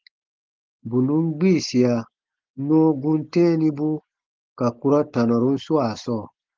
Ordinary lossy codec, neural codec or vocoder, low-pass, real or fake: Opus, 16 kbps; none; 7.2 kHz; real